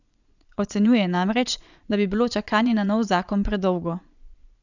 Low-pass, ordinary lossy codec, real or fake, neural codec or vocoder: 7.2 kHz; none; fake; vocoder, 22.05 kHz, 80 mel bands, Vocos